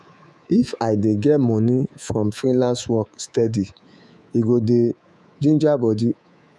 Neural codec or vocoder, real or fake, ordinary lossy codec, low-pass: codec, 24 kHz, 3.1 kbps, DualCodec; fake; none; none